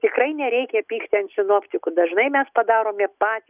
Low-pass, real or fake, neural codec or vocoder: 3.6 kHz; real; none